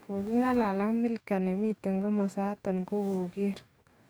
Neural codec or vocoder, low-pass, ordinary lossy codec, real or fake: codec, 44.1 kHz, 2.6 kbps, DAC; none; none; fake